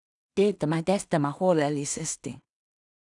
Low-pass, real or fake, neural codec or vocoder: 10.8 kHz; fake; codec, 16 kHz in and 24 kHz out, 0.4 kbps, LongCat-Audio-Codec, two codebook decoder